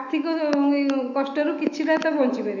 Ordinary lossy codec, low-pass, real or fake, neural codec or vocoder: none; 7.2 kHz; fake; autoencoder, 48 kHz, 128 numbers a frame, DAC-VAE, trained on Japanese speech